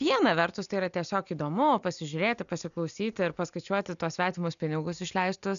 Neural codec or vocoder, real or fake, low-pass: none; real; 7.2 kHz